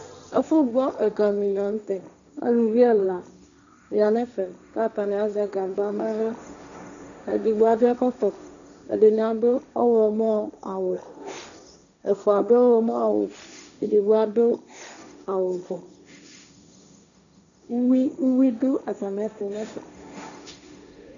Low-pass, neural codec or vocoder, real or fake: 7.2 kHz; codec, 16 kHz, 1.1 kbps, Voila-Tokenizer; fake